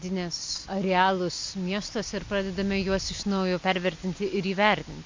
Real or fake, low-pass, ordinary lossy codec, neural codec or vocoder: real; 7.2 kHz; MP3, 48 kbps; none